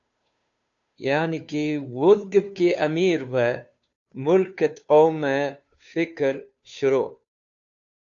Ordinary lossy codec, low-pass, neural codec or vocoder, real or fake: AAC, 64 kbps; 7.2 kHz; codec, 16 kHz, 2 kbps, FunCodec, trained on Chinese and English, 25 frames a second; fake